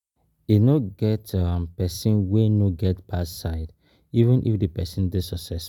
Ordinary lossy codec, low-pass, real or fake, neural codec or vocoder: none; 19.8 kHz; real; none